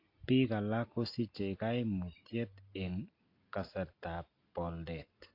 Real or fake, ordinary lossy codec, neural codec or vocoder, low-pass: real; AAC, 32 kbps; none; 5.4 kHz